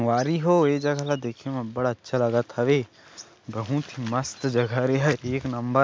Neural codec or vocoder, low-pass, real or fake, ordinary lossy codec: none; none; real; none